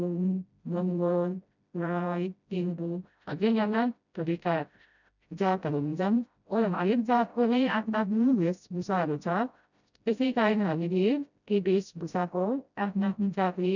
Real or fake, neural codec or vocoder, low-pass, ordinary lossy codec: fake; codec, 16 kHz, 0.5 kbps, FreqCodec, smaller model; 7.2 kHz; none